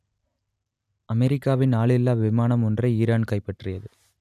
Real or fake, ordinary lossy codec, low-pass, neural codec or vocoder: real; none; 14.4 kHz; none